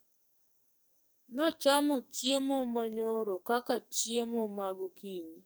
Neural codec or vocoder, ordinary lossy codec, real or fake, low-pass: codec, 44.1 kHz, 2.6 kbps, SNAC; none; fake; none